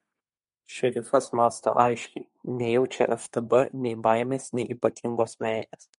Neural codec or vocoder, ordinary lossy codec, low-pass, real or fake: codec, 24 kHz, 1 kbps, SNAC; MP3, 48 kbps; 10.8 kHz; fake